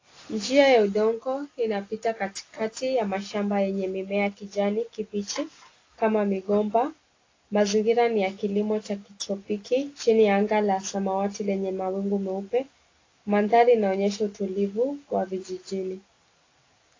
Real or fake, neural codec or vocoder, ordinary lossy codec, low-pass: real; none; AAC, 32 kbps; 7.2 kHz